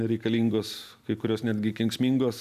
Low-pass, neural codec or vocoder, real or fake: 14.4 kHz; none; real